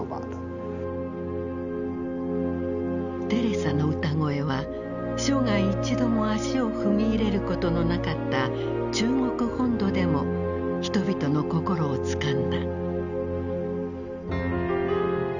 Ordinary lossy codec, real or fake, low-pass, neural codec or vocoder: none; real; 7.2 kHz; none